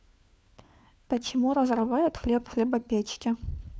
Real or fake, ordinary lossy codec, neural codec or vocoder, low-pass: fake; none; codec, 16 kHz, 4 kbps, FunCodec, trained on LibriTTS, 50 frames a second; none